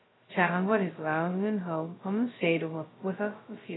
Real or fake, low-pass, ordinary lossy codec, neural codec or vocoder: fake; 7.2 kHz; AAC, 16 kbps; codec, 16 kHz, 0.2 kbps, FocalCodec